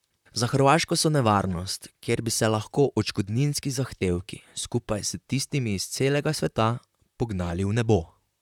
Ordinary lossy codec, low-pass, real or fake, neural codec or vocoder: none; 19.8 kHz; fake; vocoder, 44.1 kHz, 128 mel bands, Pupu-Vocoder